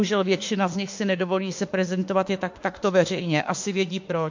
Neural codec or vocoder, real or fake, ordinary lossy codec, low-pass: autoencoder, 48 kHz, 32 numbers a frame, DAC-VAE, trained on Japanese speech; fake; MP3, 48 kbps; 7.2 kHz